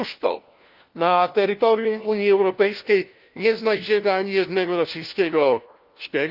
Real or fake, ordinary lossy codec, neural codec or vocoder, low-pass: fake; Opus, 32 kbps; codec, 16 kHz, 1 kbps, FunCodec, trained on LibriTTS, 50 frames a second; 5.4 kHz